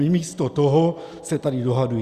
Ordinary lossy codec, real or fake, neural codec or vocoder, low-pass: Opus, 64 kbps; real; none; 14.4 kHz